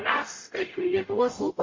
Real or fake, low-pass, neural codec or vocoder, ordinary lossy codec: fake; 7.2 kHz; codec, 44.1 kHz, 0.9 kbps, DAC; MP3, 32 kbps